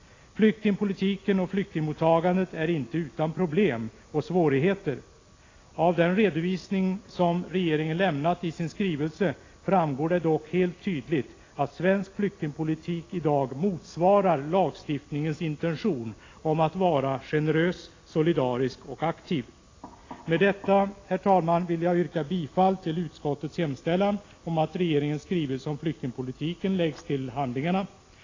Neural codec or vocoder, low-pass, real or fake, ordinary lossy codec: none; 7.2 kHz; real; AAC, 32 kbps